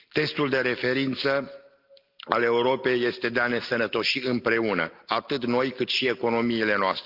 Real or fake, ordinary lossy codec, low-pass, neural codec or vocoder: real; Opus, 24 kbps; 5.4 kHz; none